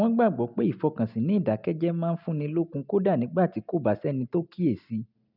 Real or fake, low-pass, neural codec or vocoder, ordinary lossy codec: real; 5.4 kHz; none; none